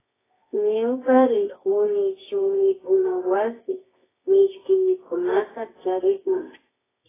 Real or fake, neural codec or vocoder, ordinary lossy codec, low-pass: fake; codec, 24 kHz, 0.9 kbps, WavTokenizer, medium music audio release; AAC, 16 kbps; 3.6 kHz